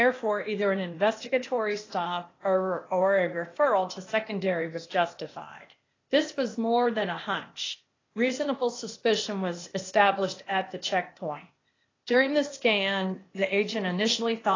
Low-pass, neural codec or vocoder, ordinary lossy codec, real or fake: 7.2 kHz; codec, 16 kHz, 0.8 kbps, ZipCodec; AAC, 32 kbps; fake